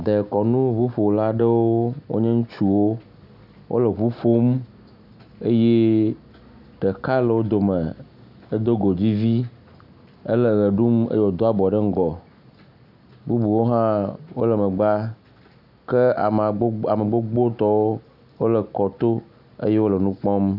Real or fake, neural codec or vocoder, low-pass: real; none; 5.4 kHz